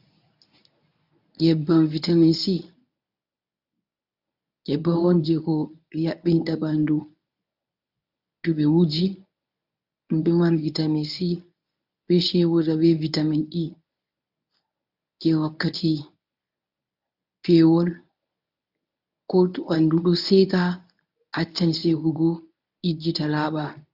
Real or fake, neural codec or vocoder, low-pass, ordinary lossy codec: fake; codec, 24 kHz, 0.9 kbps, WavTokenizer, medium speech release version 1; 5.4 kHz; AAC, 48 kbps